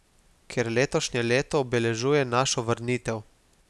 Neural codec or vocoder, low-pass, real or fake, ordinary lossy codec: none; none; real; none